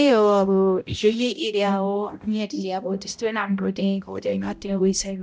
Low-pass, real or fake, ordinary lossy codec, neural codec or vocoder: none; fake; none; codec, 16 kHz, 0.5 kbps, X-Codec, HuBERT features, trained on balanced general audio